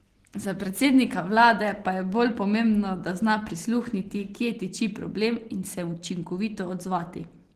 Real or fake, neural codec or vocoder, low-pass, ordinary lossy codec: real; none; 14.4 kHz; Opus, 16 kbps